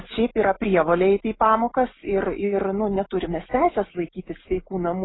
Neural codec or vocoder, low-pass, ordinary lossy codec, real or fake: none; 7.2 kHz; AAC, 16 kbps; real